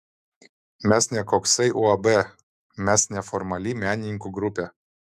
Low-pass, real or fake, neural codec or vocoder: 14.4 kHz; fake; autoencoder, 48 kHz, 128 numbers a frame, DAC-VAE, trained on Japanese speech